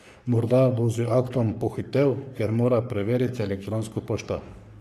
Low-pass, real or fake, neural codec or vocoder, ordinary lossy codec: 14.4 kHz; fake; codec, 44.1 kHz, 3.4 kbps, Pupu-Codec; none